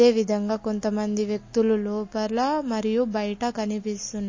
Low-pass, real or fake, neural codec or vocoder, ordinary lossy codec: 7.2 kHz; real; none; MP3, 32 kbps